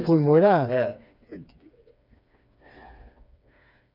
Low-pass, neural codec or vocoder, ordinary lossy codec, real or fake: 5.4 kHz; codec, 16 kHz, 4 kbps, FreqCodec, smaller model; none; fake